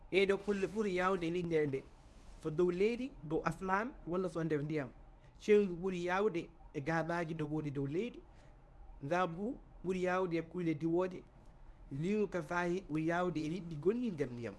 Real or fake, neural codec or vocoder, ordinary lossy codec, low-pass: fake; codec, 24 kHz, 0.9 kbps, WavTokenizer, medium speech release version 1; none; none